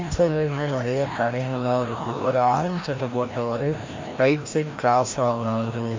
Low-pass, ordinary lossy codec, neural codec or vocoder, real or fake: 7.2 kHz; MP3, 48 kbps; codec, 16 kHz, 1 kbps, FreqCodec, larger model; fake